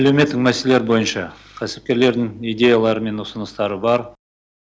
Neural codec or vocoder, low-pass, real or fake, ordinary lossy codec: none; none; real; none